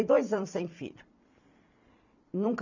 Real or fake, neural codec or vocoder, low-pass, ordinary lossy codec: fake; vocoder, 44.1 kHz, 128 mel bands every 256 samples, BigVGAN v2; 7.2 kHz; none